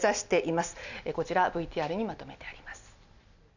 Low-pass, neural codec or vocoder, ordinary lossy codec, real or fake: 7.2 kHz; none; none; real